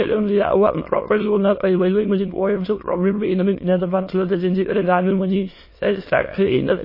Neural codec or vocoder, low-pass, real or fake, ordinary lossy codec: autoencoder, 22.05 kHz, a latent of 192 numbers a frame, VITS, trained on many speakers; 5.4 kHz; fake; MP3, 24 kbps